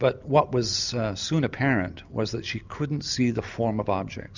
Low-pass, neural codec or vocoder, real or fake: 7.2 kHz; none; real